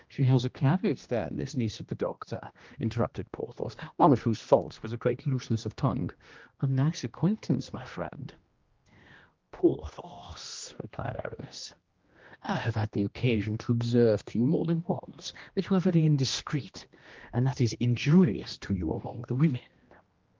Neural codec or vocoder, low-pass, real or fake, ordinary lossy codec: codec, 16 kHz, 1 kbps, X-Codec, HuBERT features, trained on general audio; 7.2 kHz; fake; Opus, 24 kbps